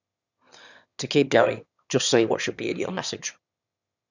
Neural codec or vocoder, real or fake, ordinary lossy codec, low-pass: autoencoder, 22.05 kHz, a latent of 192 numbers a frame, VITS, trained on one speaker; fake; none; 7.2 kHz